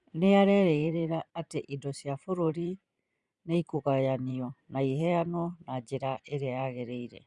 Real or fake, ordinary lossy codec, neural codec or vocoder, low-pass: fake; Opus, 64 kbps; vocoder, 44.1 kHz, 128 mel bands every 512 samples, BigVGAN v2; 10.8 kHz